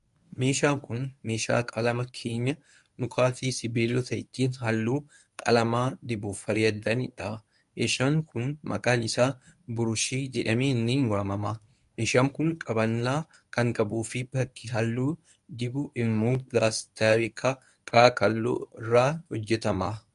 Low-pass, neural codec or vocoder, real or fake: 10.8 kHz; codec, 24 kHz, 0.9 kbps, WavTokenizer, medium speech release version 1; fake